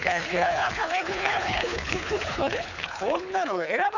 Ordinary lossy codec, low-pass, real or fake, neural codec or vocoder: none; 7.2 kHz; fake; codec, 24 kHz, 3 kbps, HILCodec